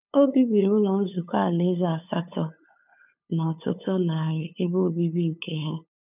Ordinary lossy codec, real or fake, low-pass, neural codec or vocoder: none; fake; 3.6 kHz; codec, 16 kHz, 8 kbps, FunCodec, trained on LibriTTS, 25 frames a second